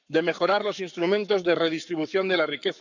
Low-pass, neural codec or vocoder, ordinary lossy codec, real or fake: 7.2 kHz; codec, 44.1 kHz, 7.8 kbps, Pupu-Codec; none; fake